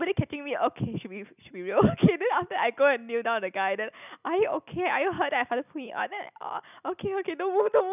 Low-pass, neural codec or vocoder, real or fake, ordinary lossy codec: 3.6 kHz; none; real; none